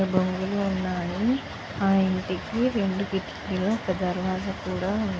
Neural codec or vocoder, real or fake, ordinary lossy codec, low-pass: none; real; none; none